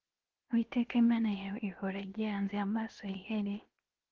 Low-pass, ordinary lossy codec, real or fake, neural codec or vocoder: 7.2 kHz; Opus, 16 kbps; fake; codec, 16 kHz, 0.7 kbps, FocalCodec